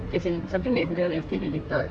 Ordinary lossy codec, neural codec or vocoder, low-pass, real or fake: none; codec, 24 kHz, 1 kbps, SNAC; 9.9 kHz; fake